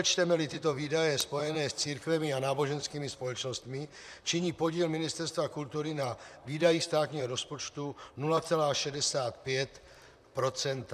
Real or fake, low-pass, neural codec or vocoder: fake; 14.4 kHz; vocoder, 44.1 kHz, 128 mel bands, Pupu-Vocoder